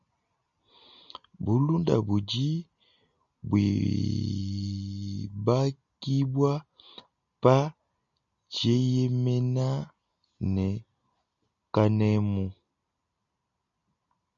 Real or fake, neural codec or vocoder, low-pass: real; none; 7.2 kHz